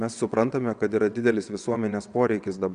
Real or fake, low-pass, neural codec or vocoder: fake; 9.9 kHz; vocoder, 22.05 kHz, 80 mel bands, WaveNeXt